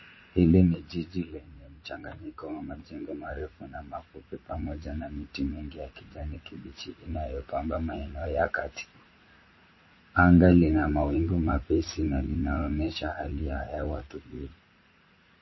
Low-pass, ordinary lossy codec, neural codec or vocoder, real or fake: 7.2 kHz; MP3, 24 kbps; vocoder, 44.1 kHz, 80 mel bands, Vocos; fake